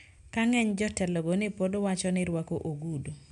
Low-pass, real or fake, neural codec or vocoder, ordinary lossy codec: 10.8 kHz; real; none; none